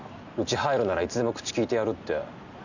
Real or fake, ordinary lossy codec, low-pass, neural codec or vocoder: real; none; 7.2 kHz; none